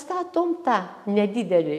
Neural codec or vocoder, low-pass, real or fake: none; 14.4 kHz; real